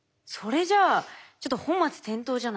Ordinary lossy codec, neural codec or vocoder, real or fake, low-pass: none; none; real; none